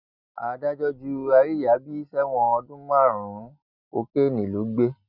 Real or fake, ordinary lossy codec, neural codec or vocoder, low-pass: real; none; none; 5.4 kHz